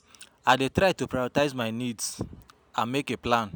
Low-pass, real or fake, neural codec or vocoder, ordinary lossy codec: none; real; none; none